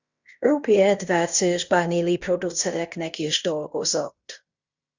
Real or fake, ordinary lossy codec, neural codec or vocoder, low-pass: fake; Opus, 64 kbps; codec, 16 kHz in and 24 kHz out, 0.9 kbps, LongCat-Audio-Codec, fine tuned four codebook decoder; 7.2 kHz